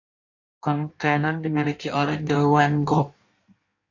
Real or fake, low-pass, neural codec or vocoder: fake; 7.2 kHz; codec, 16 kHz in and 24 kHz out, 1.1 kbps, FireRedTTS-2 codec